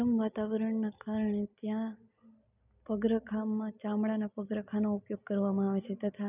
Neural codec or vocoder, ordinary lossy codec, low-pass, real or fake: none; none; 3.6 kHz; real